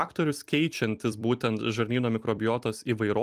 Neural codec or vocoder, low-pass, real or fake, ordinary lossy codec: vocoder, 44.1 kHz, 128 mel bands every 256 samples, BigVGAN v2; 14.4 kHz; fake; Opus, 32 kbps